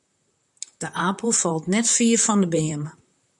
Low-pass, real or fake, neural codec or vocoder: 10.8 kHz; fake; vocoder, 44.1 kHz, 128 mel bands, Pupu-Vocoder